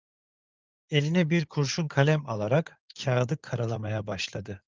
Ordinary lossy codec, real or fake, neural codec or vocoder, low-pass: Opus, 32 kbps; fake; codec, 16 kHz in and 24 kHz out, 2.2 kbps, FireRedTTS-2 codec; 7.2 kHz